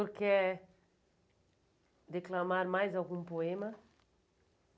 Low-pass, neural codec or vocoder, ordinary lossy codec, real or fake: none; none; none; real